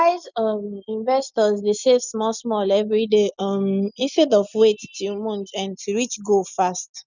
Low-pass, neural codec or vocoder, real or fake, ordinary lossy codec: 7.2 kHz; none; real; none